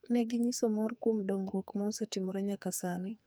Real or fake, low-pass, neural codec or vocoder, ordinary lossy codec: fake; none; codec, 44.1 kHz, 2.6 kbps, SNAC; none